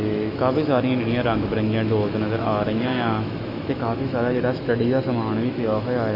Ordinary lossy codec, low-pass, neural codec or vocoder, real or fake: Opus, 64 kbps; 5.4 kHz; autoencoder, 48 kHz, 128 numbers a frame, DAC-VAE, trained on Japanese speech; fake